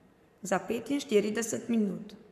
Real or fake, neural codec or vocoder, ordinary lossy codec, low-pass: fake; codec, 44.1 kHz, 7.8 kbps, Pupu-Codec; none; 14.4 kHz